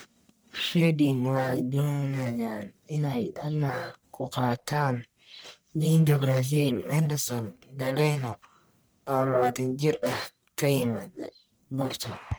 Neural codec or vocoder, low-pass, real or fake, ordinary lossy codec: codec, 44.1 kHz, 1.7 kbps, Pupu-Codec; none; fake; none